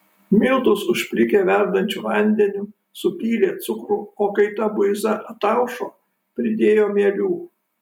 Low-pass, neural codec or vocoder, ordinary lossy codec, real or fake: 19.8 kHz; none; MP3, 96 kbps; real